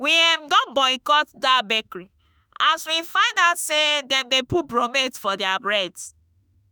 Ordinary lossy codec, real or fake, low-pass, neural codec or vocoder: none; fake; none; autoencoder, 48 kHz, 32 numbers a frame, DAC-VAE, trained on Japanese speech